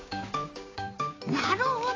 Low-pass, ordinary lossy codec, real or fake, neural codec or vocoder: 7.2 kHz; AAC, 32 kbps; fake; codec, 16 kHz, 6 kbps, DAC